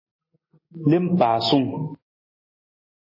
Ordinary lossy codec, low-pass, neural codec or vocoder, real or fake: MP3, 24 kbps; 5.4 kHz; none; real